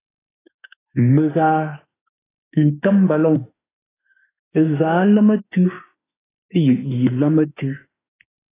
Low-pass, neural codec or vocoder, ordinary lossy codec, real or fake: 3.6 kHz; autoencoder, 48 kHz, 32 numbers a frame, DAC-VAE, trained on Japanese speech; AAC, 16 kbps; fake